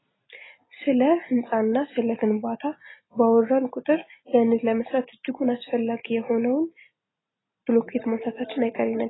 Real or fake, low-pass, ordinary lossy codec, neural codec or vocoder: real; 7.2 kHz; AAC, 16 kbps; none